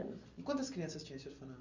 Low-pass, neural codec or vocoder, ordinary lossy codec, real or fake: 7.2 kHz; none; none; real